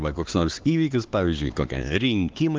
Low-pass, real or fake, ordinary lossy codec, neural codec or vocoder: 7.2 kHz; fake; Opus, 16 kbps; codec, 16 kHz, 4 kbps, X-Codec, HuBERT features, trained on LibriSpeech